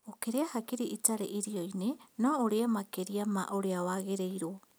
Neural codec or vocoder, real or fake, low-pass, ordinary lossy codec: none; real; none; none